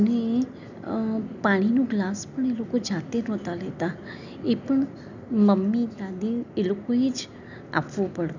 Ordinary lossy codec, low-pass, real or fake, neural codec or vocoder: none; 7.2 kHz; real; none